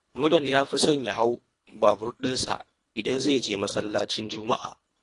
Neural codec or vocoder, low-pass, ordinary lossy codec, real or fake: codec, 24 kHz, 1.5 kbps, HILCodec; 10.8 kHz; AAC, 48 kbps; fake